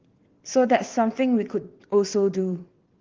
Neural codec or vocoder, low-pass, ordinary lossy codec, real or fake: vocoder, 22.05 kHz, 80 mel bands, WaveNeXt; 7.2 kHz; Opus, 16 kbps; fake